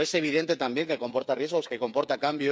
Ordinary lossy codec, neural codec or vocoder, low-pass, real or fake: none; codec, 16 kHz, 8 kbps, FreqCodec, smaller model; none; fake